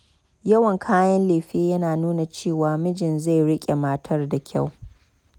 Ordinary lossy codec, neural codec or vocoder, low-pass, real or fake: none; none; 19.8 kHz; real